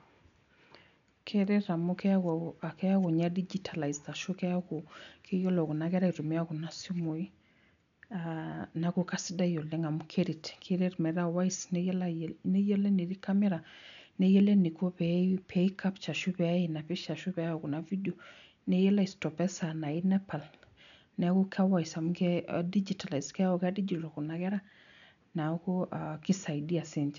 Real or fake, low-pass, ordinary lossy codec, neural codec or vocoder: real; 7.2 kHz; none; none